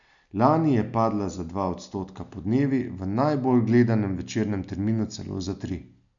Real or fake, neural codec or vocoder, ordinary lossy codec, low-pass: real; none; none; 7.2 kHz